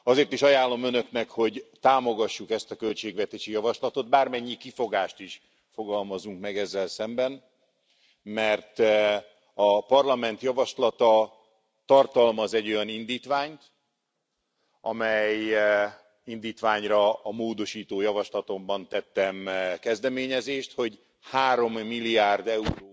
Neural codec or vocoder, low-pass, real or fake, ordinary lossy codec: none; none; real; none